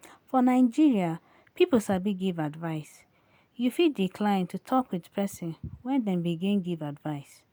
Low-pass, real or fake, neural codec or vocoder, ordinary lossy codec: none; real; none; none